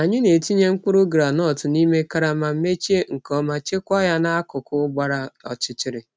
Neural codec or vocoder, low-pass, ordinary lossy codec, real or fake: none; none; none; real